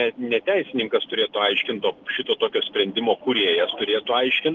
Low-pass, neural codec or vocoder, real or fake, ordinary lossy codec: 7.2 kHz; none; real; Opus, 16 kbps